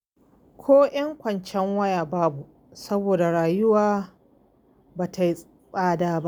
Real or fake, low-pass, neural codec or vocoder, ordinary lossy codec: real; none; none; none